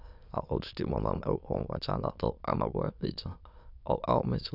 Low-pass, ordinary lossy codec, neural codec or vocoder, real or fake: 5.4 kHz; none; autoencoder, 22.05 kHz, a latent of 192 numbers a frame, VITS, trained on many speakers; fake